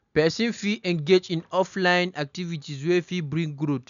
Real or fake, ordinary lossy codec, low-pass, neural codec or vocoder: real; none; 7.2 kHz; none